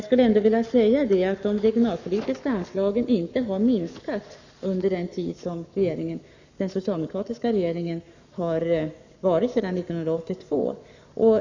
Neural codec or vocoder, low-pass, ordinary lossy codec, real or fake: codec, 44.1 kHz, 7.8 kbps, Pupu-Codec; 7.2 kHz; none; fake